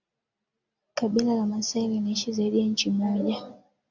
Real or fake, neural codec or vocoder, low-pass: real; none; 7.2 kHz